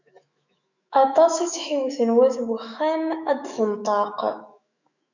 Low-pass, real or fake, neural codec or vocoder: 7.2 kHz; fake; autoencoder, 48 kHz, 128 numbers a frame, DAC-VAE, trained on Japanese speech